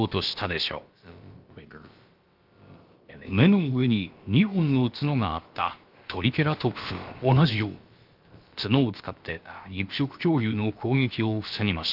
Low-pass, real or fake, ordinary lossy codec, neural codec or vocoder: 5.4 kHz; fake; Opus, 32 kbps; codec, 16 kHz, about 1 kbps, DyCAST, with the encoder's durations